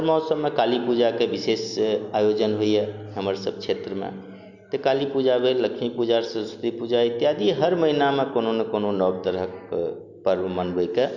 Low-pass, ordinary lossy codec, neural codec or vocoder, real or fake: 7.2 kHz; none; none; real